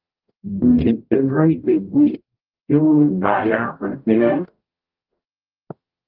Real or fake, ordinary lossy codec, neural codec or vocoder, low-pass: fake; Opus, 32 kbps; codec, 44.1 kHz, 0.9 kbps, DAC; 5.4 kHz